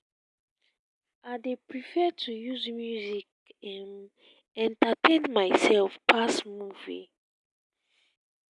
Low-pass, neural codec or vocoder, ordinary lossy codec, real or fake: 10.8 kHz; none; none; real